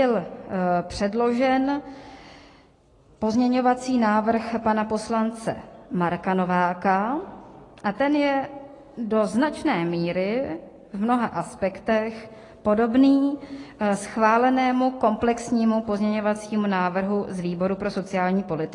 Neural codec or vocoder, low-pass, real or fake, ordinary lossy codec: none; 10.8 kHz; real; AAC, 32 kbps